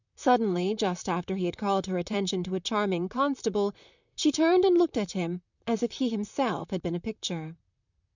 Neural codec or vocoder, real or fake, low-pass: vocoder, 44.1 kHz, 128 mel bands, Pupu-Vocoder; fake; 7.2 kHz